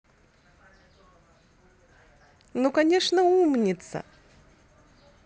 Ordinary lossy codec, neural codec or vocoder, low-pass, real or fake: none; none; none; real